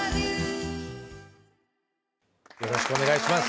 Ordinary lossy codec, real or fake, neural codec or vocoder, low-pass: none; real; none; none